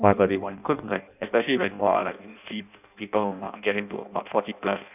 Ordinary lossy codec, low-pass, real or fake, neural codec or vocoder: none; 3.6 kHz; fake; codec, 16 kHz in and 24 kHz out, 0.6 kbps, FireRedTTS-2 codec